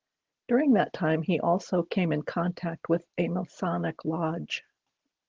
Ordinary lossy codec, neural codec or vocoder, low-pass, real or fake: Opus, 16 kbps; none; 7.2 kHz; real